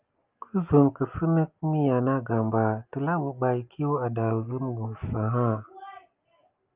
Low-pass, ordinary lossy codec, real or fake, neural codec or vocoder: 3.6 kHz; Opus, 24 kbps; real; none